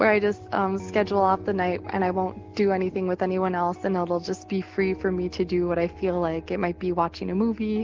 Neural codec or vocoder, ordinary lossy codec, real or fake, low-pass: none; Opus, 16 kbps; real; 7.2 kHz